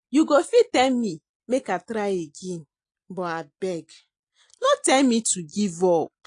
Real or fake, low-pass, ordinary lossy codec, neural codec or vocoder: real; 9.9 kHz; AAC, 48 kbps; none